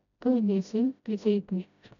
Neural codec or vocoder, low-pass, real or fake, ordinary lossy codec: codec, 16 kHz, 0.5 kbps, FreqCodec, smaller model; 7.2 kHz; fake; none